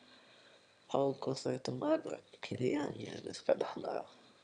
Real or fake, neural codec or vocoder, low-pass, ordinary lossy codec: fake; autoencoder, 22.05 kHz, a latent of 192 numbers a frame, VITS, trained on one speaker; 9.9 kHz; none